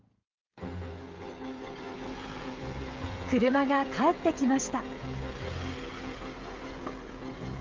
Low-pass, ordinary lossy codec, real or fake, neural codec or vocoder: 7.2 kHz; Opus, 32 kbps; fake; codec, 16 kHz, 16 kbps, FreqCodec, smaller model